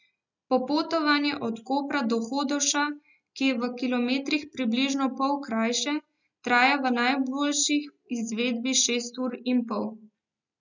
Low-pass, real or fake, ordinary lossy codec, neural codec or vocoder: 7.2 kHz; real; none; none